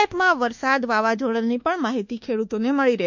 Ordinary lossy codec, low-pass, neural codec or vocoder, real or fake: none; 7.2 kHz; codec, 24 kHz, 1.2 kbps, DualCodec; fake